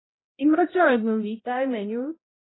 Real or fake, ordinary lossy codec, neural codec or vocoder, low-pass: fake; AAC, 16 kbps; codec, 16 kHz, 0.5 kbps, X-Codec, HuBERT features, trained on balanced general audio; 7.2 kHz